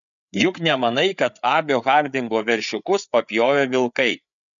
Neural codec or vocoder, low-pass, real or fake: codec, 16 kHz, 8 kbps, FreqCodec, larger model; 7.2 kHz; fake